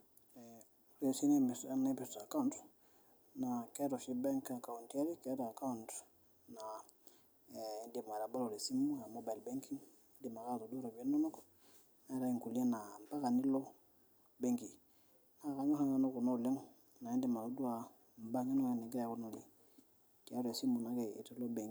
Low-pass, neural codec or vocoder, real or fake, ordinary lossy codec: none; none; real; none